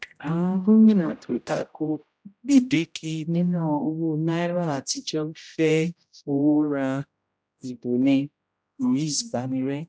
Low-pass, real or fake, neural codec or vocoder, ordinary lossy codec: none; fake; codec, 16 kHz, 0.5 kbps, X-Codec, HuBERT features, trained on general audio; none